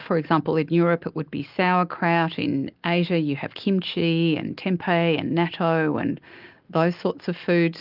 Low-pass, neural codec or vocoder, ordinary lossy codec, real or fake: 5.4 kHz; none; Opus, 24 kbps; real